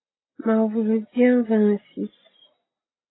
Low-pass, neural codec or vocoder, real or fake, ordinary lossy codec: 7.2 kHz; none; real; AAC, 16 kbps